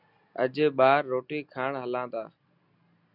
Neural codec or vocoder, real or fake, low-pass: none; real; 5.4 kHz